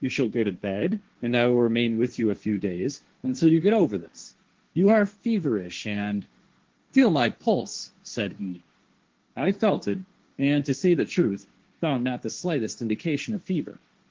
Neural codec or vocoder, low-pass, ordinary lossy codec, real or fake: codec, 16 kHz, 1.1 kbps, Voila-Tokenizer; 7.2 kHz; Opus, 16 kbps; fake